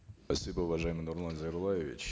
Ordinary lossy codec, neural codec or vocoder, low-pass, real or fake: none; none; none; real